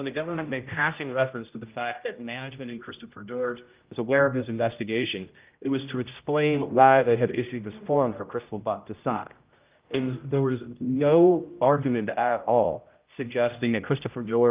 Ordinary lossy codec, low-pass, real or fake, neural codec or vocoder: Opus, 24 kbps; 3.6 kHz; fake; codec, 16 kHz, 0.5 kbps, X-Codec, HuBERT features, trained on general audio